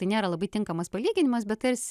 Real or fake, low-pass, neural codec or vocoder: real; 14.4 kHz; none